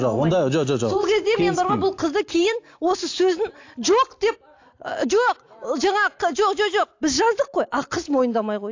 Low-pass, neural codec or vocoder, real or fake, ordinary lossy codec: 7.2 kHz; none; real; AAC, 48 kbps